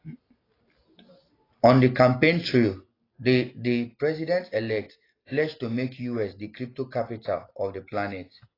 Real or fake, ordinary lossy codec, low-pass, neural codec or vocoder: real; AAC, 24 kbps; 5.4 kHz; none